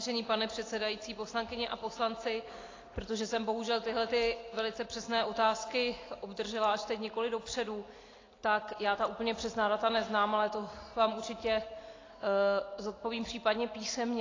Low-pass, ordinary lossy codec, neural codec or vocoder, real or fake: 7.2 kHz; AAC, 32 kbps; none; real